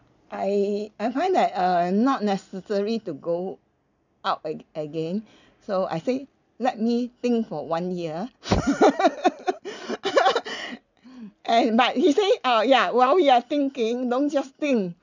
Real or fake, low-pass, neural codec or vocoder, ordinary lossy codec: real; 7.2 kHz; none; none